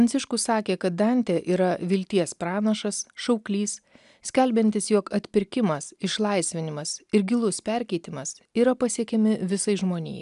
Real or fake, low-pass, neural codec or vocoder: real; 10.8 kHz; none